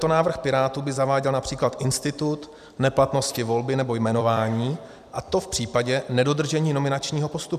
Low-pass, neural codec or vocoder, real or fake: 14.4 kHz; vocoder, 44.1 kHz, 128 mel bands every 256 samples, BigVGAN v2; fake